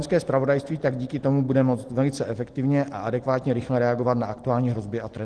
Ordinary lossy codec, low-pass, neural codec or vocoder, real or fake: Opus, 16 kbps; 10.8 kHz; none; real